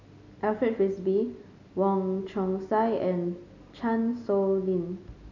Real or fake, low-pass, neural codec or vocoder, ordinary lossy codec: real; 7.2 kHz; none; none